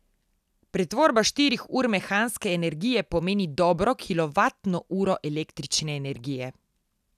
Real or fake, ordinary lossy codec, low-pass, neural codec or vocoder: real; none; 14.4 kHz; none